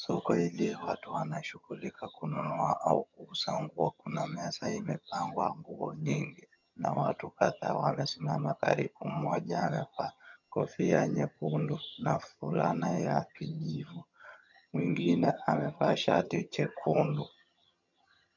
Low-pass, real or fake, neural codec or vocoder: 7.2 kHz; fake; vocoder, 22.05 kHz, 80 mel bands, HiFi-GAN